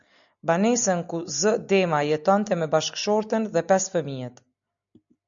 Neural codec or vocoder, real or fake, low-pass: none; real; 7.2 kHz